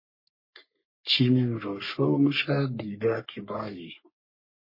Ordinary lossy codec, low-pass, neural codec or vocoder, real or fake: MP3, 24 kbps; 5.4 kHz; codec, 44.1 kHz, 3.4 kbps, Pupu-Codec; fake